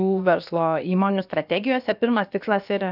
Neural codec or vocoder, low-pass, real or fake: codec, 16 kHz, about 1 kbps, DyCAST, with the encoder's durations; 5.4 kHz; fake